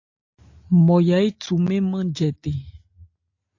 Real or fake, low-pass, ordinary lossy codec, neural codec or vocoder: real; 7.2 kHz; AAC, 48 kbps; none